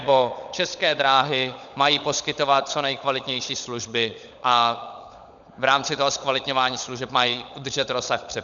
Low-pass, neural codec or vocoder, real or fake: 7.2 kHz; codec, 16 kHz, 16 kbps, FunCodec, trained on LibriTTS, 50 frames a second; fake